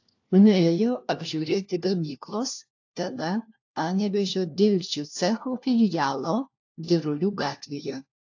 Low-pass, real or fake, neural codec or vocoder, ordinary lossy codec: 7.2 kHz; fake; codec, 16 kHz, 1 kbps, FunCodec, trained on LibriTTS, 50 frames a second; AAC, 48 kbps